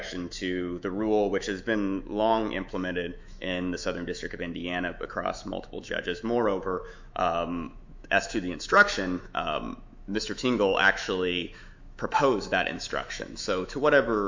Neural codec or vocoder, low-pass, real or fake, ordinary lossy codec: autoencoder, 48 kHz, 128 numbers a frame, DAC-VAE, trained on Japanese speech; 7.2 kHz; fake; MP3, 64 kbps